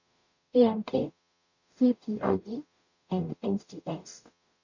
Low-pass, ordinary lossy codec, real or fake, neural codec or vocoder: 7.2 kHz; none; fake; codec, 44.1 kHz, 0.9 kbps, DAC